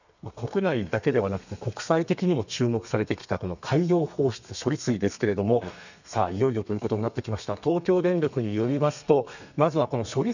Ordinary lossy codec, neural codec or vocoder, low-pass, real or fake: none; codec, 32 kHz, 1.9 kbps, SNAC; 7.2 kHz; fake